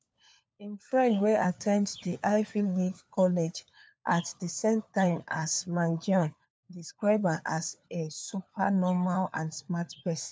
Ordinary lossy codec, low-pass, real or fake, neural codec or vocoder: none; none; fake; codec, 16 kHz, 4 kbps, FunCodec, trained on LibriTTS, 50 frames a second